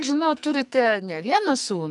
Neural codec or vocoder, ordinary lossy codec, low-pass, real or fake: codec, 44.1 kHz, 2.6 kbps, SNAC; MP3, 96 kbps; 10.8 kHz; fake